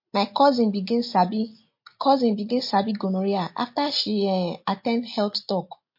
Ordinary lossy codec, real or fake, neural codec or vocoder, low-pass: MP3, 32 kbps; real; none; 5.4 kHz